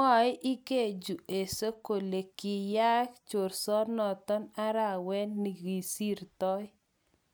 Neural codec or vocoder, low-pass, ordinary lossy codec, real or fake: none; none; none; real